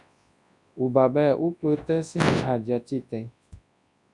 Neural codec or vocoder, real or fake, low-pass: codec, 24 kHz, 0.9 kbps, WavTokenizer, large speech release; fake; 10.8 kHz